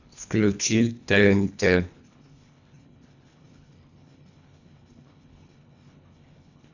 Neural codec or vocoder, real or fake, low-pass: codec, 24 kHz, 1.5 kbps, HILCodec; fake; 7.2 kHz